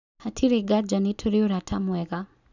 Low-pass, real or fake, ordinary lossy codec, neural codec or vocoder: 7.2 kHz; real; none; none